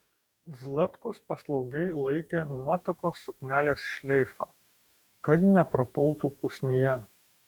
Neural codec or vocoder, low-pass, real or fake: codec, 44.1 kHz, 2.6 kbps, DAC; 19.8 kHz; fake